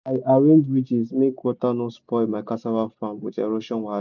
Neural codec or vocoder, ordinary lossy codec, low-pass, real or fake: none; none; 7.2 kHz; real